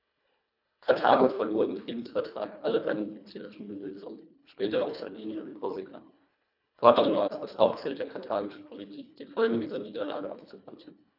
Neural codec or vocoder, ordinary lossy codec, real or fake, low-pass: codec, 24 kHz, 1.5 kbps, HILCodec; none; fake; 5.4 kHz